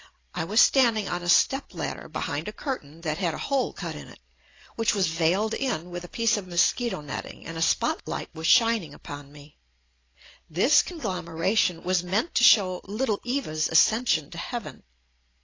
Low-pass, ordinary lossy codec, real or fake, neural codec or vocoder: 7.2 kHz; AAC, 32 kbps; real; none